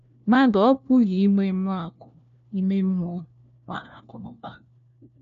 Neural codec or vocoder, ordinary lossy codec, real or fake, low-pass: codec, 16 kHz, 1 kbps, FunCodec, trained on LibriTTS, 50 frames a second; none; fake; 7.2 kHz